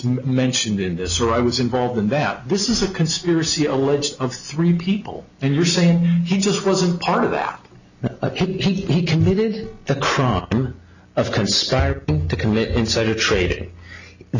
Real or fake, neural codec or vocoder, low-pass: real; none; 7.2 kHz